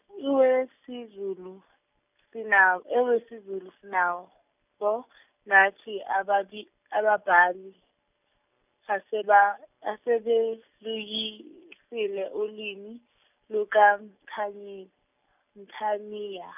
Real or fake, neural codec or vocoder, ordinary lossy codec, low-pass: real; none; none; 3.6 kHz